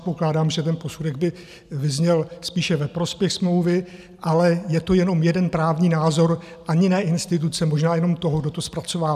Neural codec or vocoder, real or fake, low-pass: none; real; 14.4 kHz